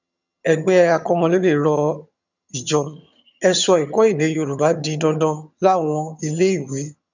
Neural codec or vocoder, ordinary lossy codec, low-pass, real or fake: vocoder, 22.05 kHz, 80 mel bands, HiFi-GAN; none; 7.2 kHz; fake